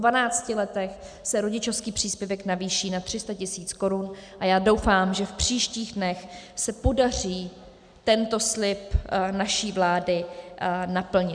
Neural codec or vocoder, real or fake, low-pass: none; real; 9.9 kHz